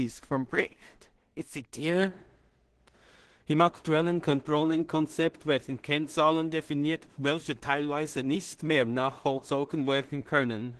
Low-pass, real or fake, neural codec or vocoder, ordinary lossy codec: 10.8 kHz; fake; codec, 16 kHz in and 24 kHz out, 0.4 kbps, LongCat-Audio-Codec, two codebook decoder; Opus, 16 kbps